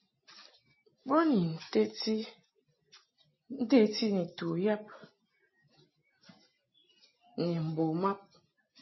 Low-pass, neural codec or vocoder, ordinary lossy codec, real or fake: 7.2 kHz; none; MP3, 24 kbps; real